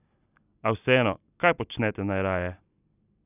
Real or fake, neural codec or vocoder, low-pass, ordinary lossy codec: real; none; 3.6 kHz; none